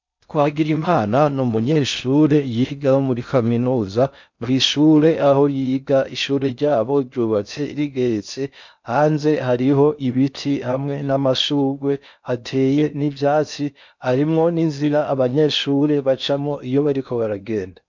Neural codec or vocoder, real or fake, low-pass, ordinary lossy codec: codec, 16 kHz in and 24 kHz out, 0.6 kbps, FocalCodec, streaming, 4096 codes; fake; 7.2 kHz; MP3, 64 kbps